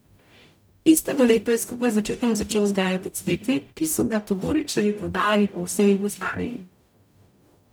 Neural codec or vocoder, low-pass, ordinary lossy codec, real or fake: codec, 44.1 kHz, 0.9 kbps, DAC; none; none; fake